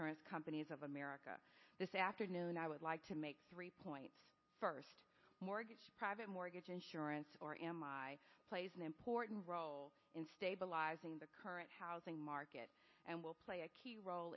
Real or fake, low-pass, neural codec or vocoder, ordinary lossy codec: real; 7.2 kHz; none; MP3, 24 kbps